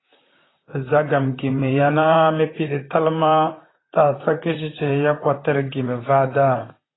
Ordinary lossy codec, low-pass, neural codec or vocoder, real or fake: AAC, 16 kbps; 7.2 kHz; vocoder, 44.1 kHz, 128 mel bands, Pupu-Vocoder; fake